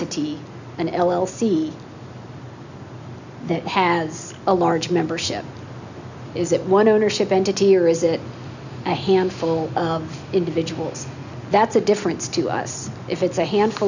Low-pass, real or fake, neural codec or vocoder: 7.2 kHz; real; none